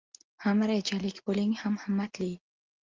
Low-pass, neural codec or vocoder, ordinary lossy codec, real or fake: 7.2 kHz; none; Opus, 24 kbps; real